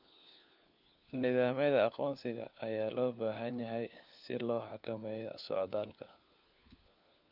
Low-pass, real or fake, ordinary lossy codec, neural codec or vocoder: 5.4 kHz; fake; none; codec, 16 kHz, 4 kbps, FunCodec, trained on LibriTTS, 50 frames a second